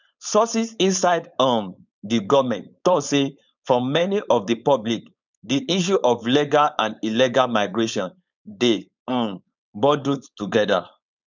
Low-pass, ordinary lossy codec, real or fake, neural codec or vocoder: 7.2 kHz; none; fake; codec, 16 kHz, 4.8 kbps, FACodec